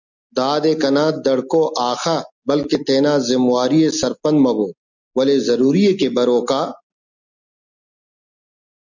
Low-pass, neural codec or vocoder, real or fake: 7.2 kHz; none; real